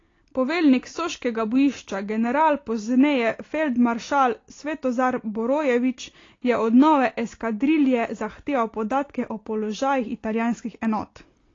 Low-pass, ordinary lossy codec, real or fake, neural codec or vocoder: 7.2 kHz; AAC, 32 kbps; real; none